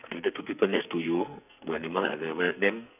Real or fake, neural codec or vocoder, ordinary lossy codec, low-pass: fake; codec, 44.1 kHz, 2.6 kbps, SNAC; none; 3.6 kHz